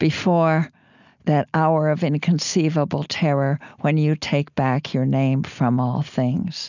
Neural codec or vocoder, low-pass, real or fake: none; 7.2 kHz; real